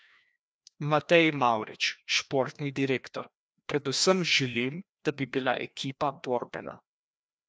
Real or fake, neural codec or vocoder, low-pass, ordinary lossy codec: fake; codec, 16 kHz, 1 kbps, FreqCodec, larger model; none; none